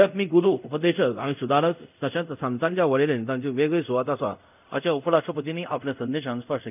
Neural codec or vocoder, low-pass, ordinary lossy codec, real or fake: codec, 24 kHz, 0.5 kbps, DualCodec; 3.6 kHz; none; fake